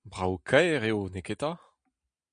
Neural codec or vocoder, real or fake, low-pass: none; real; 9.9 kHz